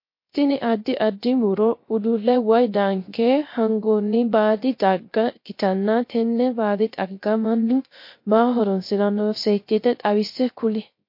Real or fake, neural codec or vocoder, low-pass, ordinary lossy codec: fake; codec, 16 kHz, 0.3 kbps, FocalCodec; 5.4 kHz; MP3, 32 kbps